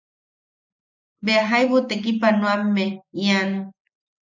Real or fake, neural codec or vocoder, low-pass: real; none; 7.2 kHz